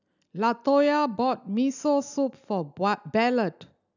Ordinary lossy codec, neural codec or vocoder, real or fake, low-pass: none; none; real; 7.2 kHz